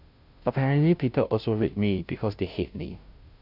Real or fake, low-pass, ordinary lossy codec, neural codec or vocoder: fake; 5.4 kHz; none; codec, 16 kHz, 0.5 kbps, FunCodec, trained on Chinese and English, 25 frames a second